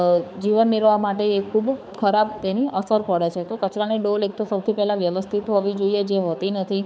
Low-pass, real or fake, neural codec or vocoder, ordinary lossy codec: none; fake; codec, 16 kHz, 4 kbps, X-Codec, HuBERT features, trained on balanced general audio; none